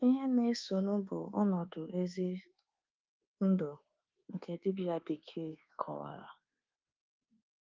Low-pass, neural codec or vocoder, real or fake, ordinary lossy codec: 7.2 kHz; codec, 24 kHz, 1.2 kbps, DualCodec; fake; Opus, 32 kbps